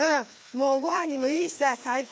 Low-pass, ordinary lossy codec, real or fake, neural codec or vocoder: none; none; fake; codec, 16 kHz, 2 kbps, FreqCodec, larger model